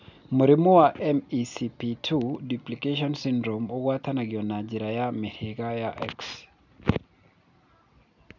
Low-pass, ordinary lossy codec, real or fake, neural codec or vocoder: 7.2 kHz; none; real; none